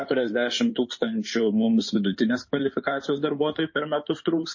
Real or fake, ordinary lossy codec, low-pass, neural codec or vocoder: fake; MP3, 32 kbps; 7.2 kHz; codec, 16 kHz in and 24 kHz out, 2.2 kbps, FireRedTTS-2 codec